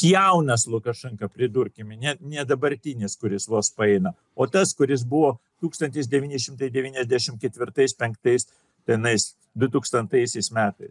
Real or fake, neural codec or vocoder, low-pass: real; none; 10.8 kHz